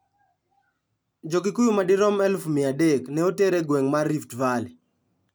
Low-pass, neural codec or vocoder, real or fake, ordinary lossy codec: none; none; real; none